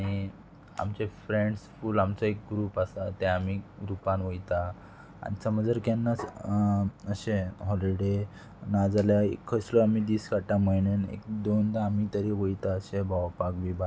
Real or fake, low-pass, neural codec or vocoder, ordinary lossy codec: real; none; none; none